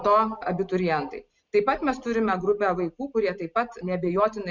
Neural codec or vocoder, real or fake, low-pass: none; real; 7.2 kHz